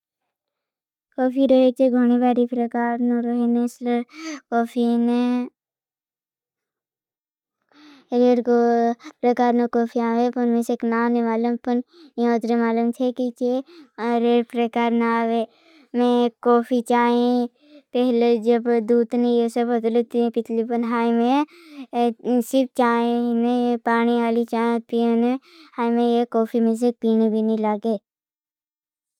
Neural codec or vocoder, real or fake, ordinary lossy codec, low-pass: none; real; none; 19.8 kHz